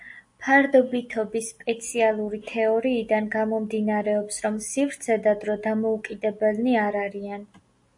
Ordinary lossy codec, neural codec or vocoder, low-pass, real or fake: AAC, 64 kbps; none; 10.8 kHz; real